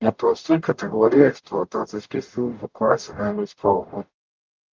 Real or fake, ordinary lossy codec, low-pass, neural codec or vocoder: fake; Opus, 32 kbps; 7.2 kHz; codec, 44.1 kHz, 0.9 kbps, DAC